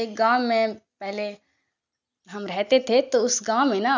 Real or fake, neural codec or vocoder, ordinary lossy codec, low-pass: real; none; none; 7.2 kHz